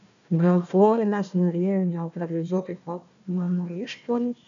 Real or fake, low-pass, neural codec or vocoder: fake; 7.2 kHz; codec, 16 kHz, 1 kbps, FunCodec, trained on Chinese and English, 50 frames a second